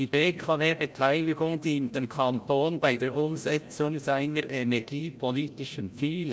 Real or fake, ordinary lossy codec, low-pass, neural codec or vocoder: fake; none; none; codec, 16 kHz, 0.5 kbps, FreqCodec, larger model